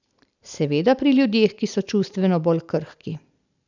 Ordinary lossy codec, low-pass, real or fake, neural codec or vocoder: none; 7.2 kHz; real; none